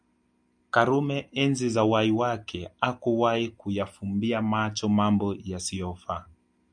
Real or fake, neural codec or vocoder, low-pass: real; none; 9.9 kHz